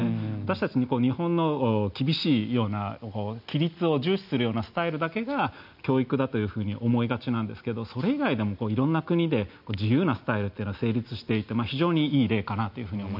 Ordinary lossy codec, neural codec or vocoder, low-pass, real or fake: none; none; 5.4 kHz; real